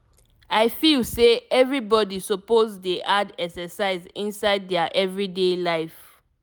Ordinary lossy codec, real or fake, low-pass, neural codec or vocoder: none; real; none; none